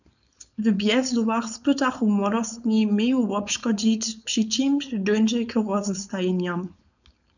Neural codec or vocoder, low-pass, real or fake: codec, 16 kHz, 4.8 kbps, FACodec; 7.2 kHz; fake